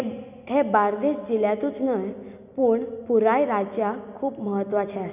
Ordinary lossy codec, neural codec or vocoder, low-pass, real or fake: none; none; 3.6 kHz; real